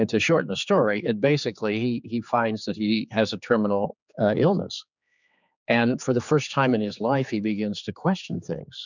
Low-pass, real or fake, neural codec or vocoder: 7.2 kHz; fake; codec, 16 kHz, 4 kbps, X-Codec, HuBERT features, trained on general audio